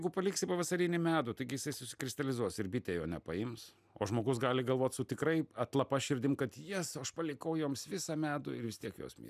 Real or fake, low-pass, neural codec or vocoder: real; 14.4 kHz; none